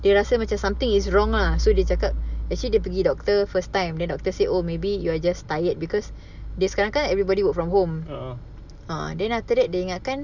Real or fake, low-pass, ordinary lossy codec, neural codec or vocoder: real; 7.2 kHz; none; none